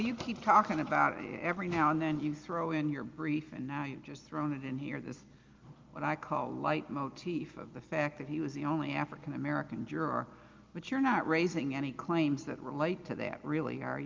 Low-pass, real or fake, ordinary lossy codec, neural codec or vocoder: 7.2 kHz; real; Opus, 32 kbps; none